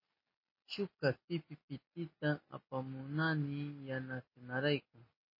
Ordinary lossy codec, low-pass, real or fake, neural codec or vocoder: MP3, 24 kbps; 5.4 kHz; real; none